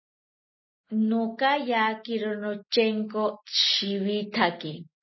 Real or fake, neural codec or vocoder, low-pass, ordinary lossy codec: real; none; 7.2 kHz; MP3, 24 kbps